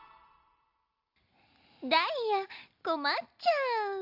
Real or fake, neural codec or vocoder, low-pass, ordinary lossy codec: real; none; 5.4 kHz; AAC, 48 kbps